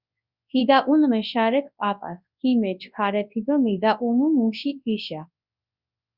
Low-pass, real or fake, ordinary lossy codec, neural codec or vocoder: 5.4 kHz; fake; Opus, 64 kbps; codec, 24 kHz, 0.9 kbps, WavTokenizer, large speech release